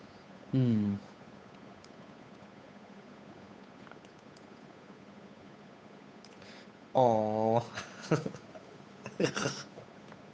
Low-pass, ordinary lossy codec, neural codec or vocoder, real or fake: none; none; codec, 16 kHz, 8 kbps, FunCodec, trained on Chinese and English, 25 frames a second; fake